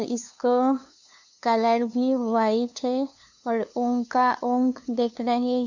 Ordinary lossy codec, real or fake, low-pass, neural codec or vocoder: none; fake; 7.2 kHz; codec, 16 kHz, 2 kbps, FunCodec, trained on LibriTTS, 25 frames a second